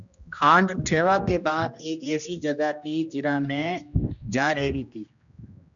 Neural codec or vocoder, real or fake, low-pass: codec, 16 kHz, 1 kbps, X-Codec, HuBERT features, trained on general audio; fake; 7.2 kHz